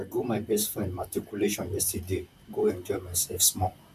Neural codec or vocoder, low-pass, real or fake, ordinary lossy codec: vocoder, 44.1 kHz, 128 mel bands, Pupu-Vocoder; 14.4 kHz; fake; none